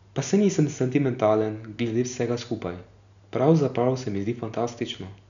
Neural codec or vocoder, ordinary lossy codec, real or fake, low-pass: none; none; real; 7.2 kHz